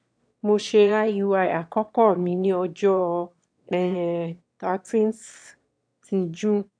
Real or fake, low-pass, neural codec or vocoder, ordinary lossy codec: fake; 9.9 kHz; autoencoder, 22.05 kHz, a latent of 192 numbers a frame, VITS, trained on one speaker; AAC, 64 kbps